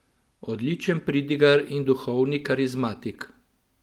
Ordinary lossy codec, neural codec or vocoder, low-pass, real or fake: Opus, 24 kbps; none; 19.8 kHz; real